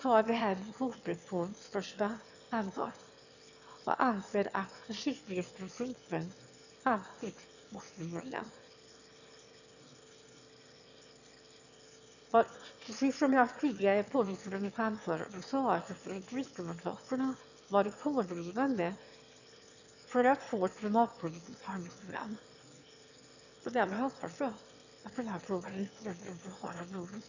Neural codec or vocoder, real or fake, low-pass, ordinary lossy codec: autoencoder, 22.05 kHz, a latent of 192 numbers a frame, VITS, trained on one speaker; fake; 7.2 kHz; none